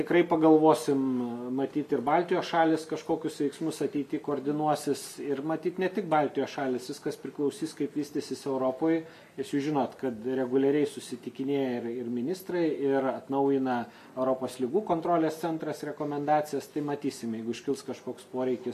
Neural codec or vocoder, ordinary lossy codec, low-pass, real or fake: none; MP3, 96 kbps; 14.4 kHz; real